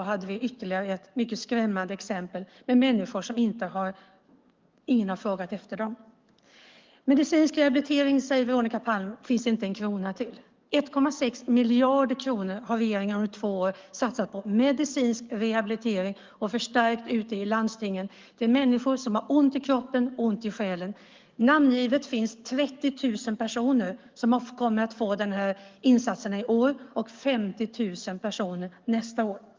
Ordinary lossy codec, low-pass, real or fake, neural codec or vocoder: Opus, 32 kbps; 7.2 kHz; fake; codec, 44.1 kHz, 7.8 kbps, DAC